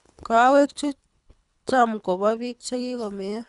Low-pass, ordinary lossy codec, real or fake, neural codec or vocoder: 10.8 kHz; none; fake; codec, 24 kHz, 3 kbps, HILCodec